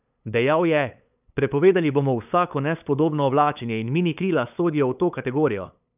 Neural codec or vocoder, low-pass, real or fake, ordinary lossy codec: codec, 16 kHz, 8 kbps, FunCodec, trained on LibriTTS, 25 frames a second; 3.6 kHz; fake; none